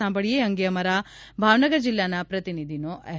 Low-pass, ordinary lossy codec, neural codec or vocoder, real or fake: none; none; none; real